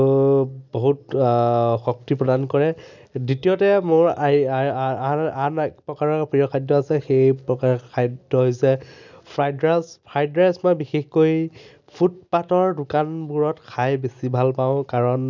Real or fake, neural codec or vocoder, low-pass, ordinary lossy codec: real; none; 7.2 kHz; none